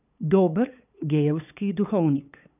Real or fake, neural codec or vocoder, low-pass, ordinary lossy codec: fake; codec, 16 kHz, 8 kbps, FunCodec, trained on LibriTTS, 25 frames a second; 3.6 kHz; none